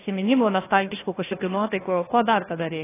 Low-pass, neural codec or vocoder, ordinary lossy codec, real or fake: 3.6 kHz; codec, 16 kHz, 1 kbps, FunCodec, trained on Chinese and English, 50 frames a second; AAC, 16 kbps; fake